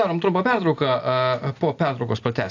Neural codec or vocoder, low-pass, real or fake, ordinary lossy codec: none; 7.2 kHz; real; AAC, 48 kbps